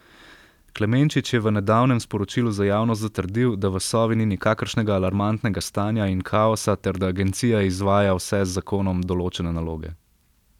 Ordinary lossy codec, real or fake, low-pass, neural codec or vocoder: none; real; 19.8 kHz; none